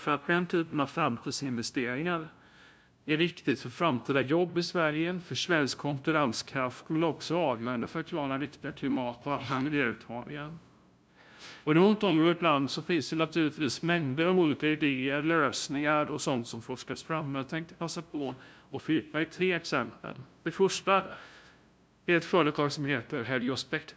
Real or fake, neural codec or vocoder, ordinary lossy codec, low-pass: fake; codec, 16 kHz, 0.5 kbps, FunCodec, trained on LibriTTS, 25 frames a second; none; none